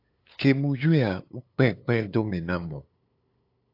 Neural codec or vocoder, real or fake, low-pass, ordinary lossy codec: codec, 16 kHz, 8 kbps, FunCodec, trained on LibriTTS, 25 frames a second; fake; 5.4 kHz; AAC, 32 kbps